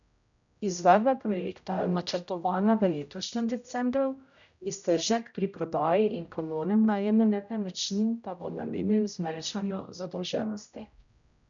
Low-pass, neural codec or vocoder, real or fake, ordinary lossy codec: 7.2 kHz; codec, 16 kHz, 0.5 kbps, X-Codec, HuBERT features, trained on general audio; fake; none